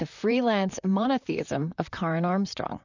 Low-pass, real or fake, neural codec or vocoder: 7.2 kHz; fake; vocoder, 44.1 kHz, 128 mel bands, Pupu-Vocoder